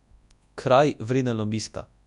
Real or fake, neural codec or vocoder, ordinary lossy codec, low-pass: fake; codec, 24 kHz, 0.9 kbps, WavTokenizer, large speech release; none; 10.8 kHz